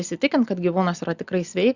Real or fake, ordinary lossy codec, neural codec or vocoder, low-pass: real; Opus, 64 kbps; none; 7.2 kHz